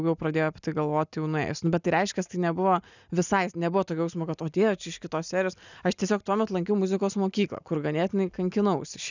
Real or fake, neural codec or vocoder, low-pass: real; none; 7.2 kHz